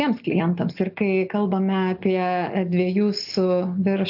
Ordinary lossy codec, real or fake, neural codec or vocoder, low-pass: AAC, 48 kbps; real; none; 5.4 kHz